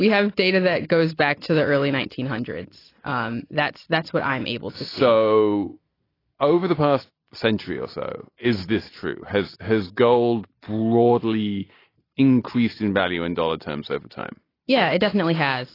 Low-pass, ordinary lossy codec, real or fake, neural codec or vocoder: 5.4 kHz; AAC, 24 kbps; real; none